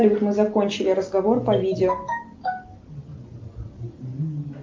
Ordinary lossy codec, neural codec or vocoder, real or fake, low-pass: Opus, 32 kbps; none; real; 7.2 kHz